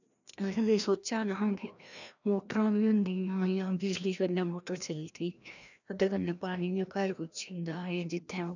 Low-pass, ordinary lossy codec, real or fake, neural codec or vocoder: 7.2 kHz; none; fake; codec, 16 kHz, 1 kbps, FreqCodec, larger model